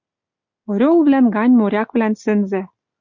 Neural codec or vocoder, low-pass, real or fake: none; 7.2 kHz; real